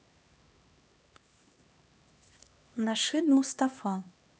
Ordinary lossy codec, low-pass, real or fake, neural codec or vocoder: none; none; fake; codec, 16 kHz, 2 kbps, X-Codec, HuBERT features, trained on LibriSpeech